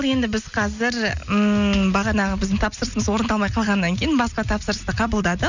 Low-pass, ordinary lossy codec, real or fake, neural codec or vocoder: 7.2 kHz; none; real; none